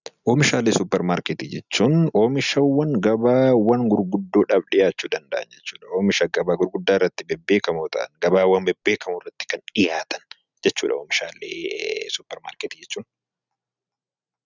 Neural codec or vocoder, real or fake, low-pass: none; real; 7.2 kHz